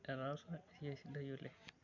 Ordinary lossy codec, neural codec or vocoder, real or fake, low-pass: none; none; real; 7.2 kHz